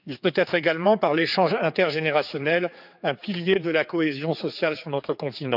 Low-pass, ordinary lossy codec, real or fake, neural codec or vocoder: 5.4 kHz; none; fake; codec, 16 kHz, 4 kbps, X-Codec, HuBERT features, trained on general audio